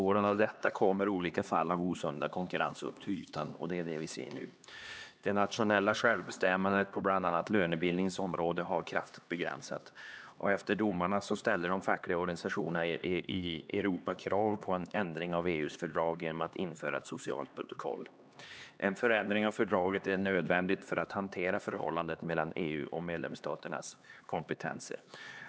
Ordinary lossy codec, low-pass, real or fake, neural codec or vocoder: none; none; fake; codec, 16 kHz, 2 kbps, X-Codec, HuBERT features, trained on LibriSpeech